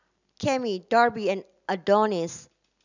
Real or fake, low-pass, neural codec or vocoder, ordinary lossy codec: real; 7.2 kHz; none; none